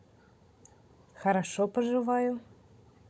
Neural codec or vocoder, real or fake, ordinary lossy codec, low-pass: codec, 16 kHz, 16 kbps, FunCodec, trained on Chinese and English, 50 frames a second; fake; none; none